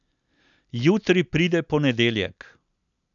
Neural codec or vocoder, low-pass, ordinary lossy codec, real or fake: none; 7.2 kHz; none; real